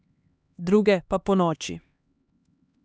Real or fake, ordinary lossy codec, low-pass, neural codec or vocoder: fake; none; none; codec, 16 kHz, 2 kbps, X-Codec, HuBERT features, trained on LibriSpeech